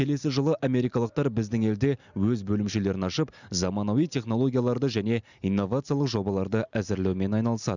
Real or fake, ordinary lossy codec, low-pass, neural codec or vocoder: real; none; 7.2 kHz; none